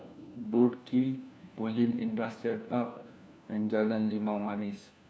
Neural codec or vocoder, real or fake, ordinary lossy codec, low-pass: codec, 16 kHz, 1 kbps, FunCodec, trained on LibriTTS, 50 frames a second; fake; none; none